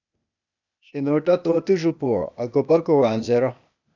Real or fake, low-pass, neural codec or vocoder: fake; 7.2 kHz; codec, 16 kHz, 0.8 kbps, ZipCodec